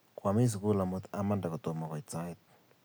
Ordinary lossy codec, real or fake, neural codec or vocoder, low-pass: none; real; none; none